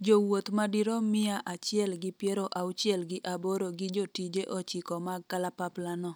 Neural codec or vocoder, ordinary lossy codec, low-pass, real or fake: none; none; none; real